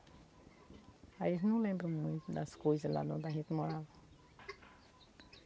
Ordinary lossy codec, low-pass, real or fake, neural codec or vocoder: none; none; real; none